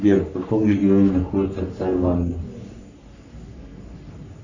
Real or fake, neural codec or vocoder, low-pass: fake; codec, 44.1 kHz, 3.4 kbps, Pupu-Codec; 7.2 kHz